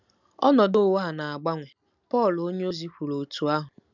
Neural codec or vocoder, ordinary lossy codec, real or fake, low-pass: none; none; real; 7.2 kHz